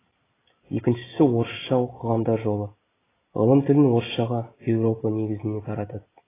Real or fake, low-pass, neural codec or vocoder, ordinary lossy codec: real; 3.6 kHz; none; AAC, 16 kbps